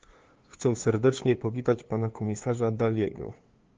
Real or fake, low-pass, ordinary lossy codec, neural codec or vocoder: fake; 7.2 kHz; Opus, 16 kbps; codec, 16 kHz, 2 kbps, FunCodec, trained on LibriTTS, 25 frames a second